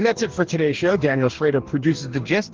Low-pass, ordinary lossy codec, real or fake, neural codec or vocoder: 7.2 kHz; Opus, 16 kbps; fake; codec, 44.1 kHz, 2.6 kbps, DAC